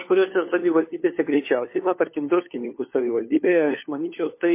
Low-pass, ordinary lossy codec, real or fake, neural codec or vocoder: 3.6 kHz; MP3, 24 kbps; fake; codec, 16 kHz, 4 kbps, FunCodec, trained on LibriTTS, 50 frames a second